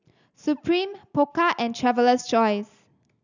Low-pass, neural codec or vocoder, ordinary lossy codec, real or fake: 7.2 kHz; none; none; real